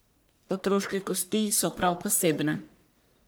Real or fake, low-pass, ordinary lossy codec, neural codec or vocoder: fake; none; none; codec, 44.1 kHz, 1.7 kbps, Pupu-Codec